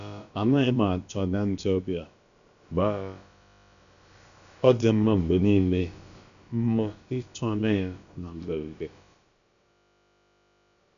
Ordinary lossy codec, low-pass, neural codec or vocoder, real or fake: none; 7.2 kHz; codec, 16 kHz, about 1 kbps, DyCAST, with the encoder's durations; fake